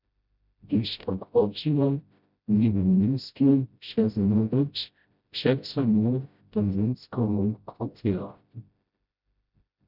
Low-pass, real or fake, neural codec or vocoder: 5.4 kHz; fake; codec, 16 kHz, 0.5 kbps, FreqCodec, smaller model